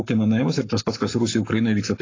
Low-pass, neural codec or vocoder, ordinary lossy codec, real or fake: 7.2 kHz; codec, 44.1 kHz, 7.8 kbps, Pupu-Codec; AAC, 32 kbps; fake